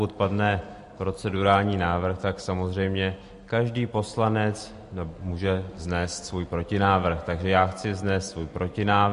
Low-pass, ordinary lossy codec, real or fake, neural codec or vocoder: 10.8 kHz; MP3, 48 kbps; real; none